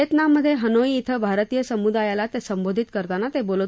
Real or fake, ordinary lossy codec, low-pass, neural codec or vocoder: real; none; none; none